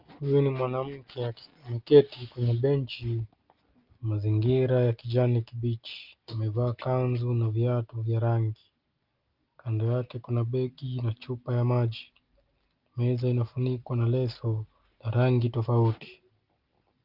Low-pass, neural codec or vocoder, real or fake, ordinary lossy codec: 5.4 kHz; none; real; Opus, 32 kbps